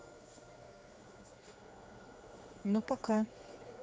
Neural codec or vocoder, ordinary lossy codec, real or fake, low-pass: codec, 16 kHz, 4 kbps, X-Codec, HuBERT features, trained on general audio; none; fake; none